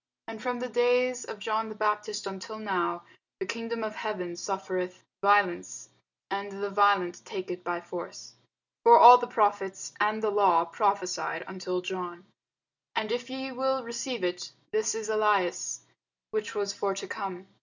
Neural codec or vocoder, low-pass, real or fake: none; 7.2 kHz; real